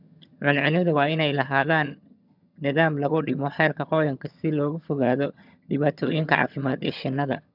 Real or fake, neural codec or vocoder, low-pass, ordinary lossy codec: fake; vocoder, 22.05 kHz, 80 mel bands, HiFi-GAN; 5.4 kHz; none